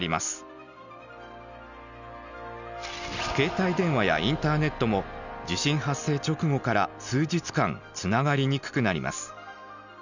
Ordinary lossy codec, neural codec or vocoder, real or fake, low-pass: none; none; real; 7.2 kHz